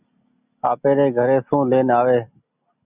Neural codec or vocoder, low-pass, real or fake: none; 3.6 kHz; real